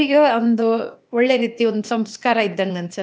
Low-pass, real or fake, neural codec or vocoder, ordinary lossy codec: none; fake; codec, 16 kHz, 0.8 kbps, ZipCodec; none